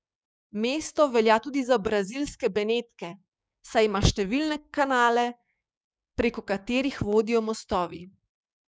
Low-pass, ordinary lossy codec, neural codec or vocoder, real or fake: none; none; codec, 16 kHz, 6 kbps, DAC; fake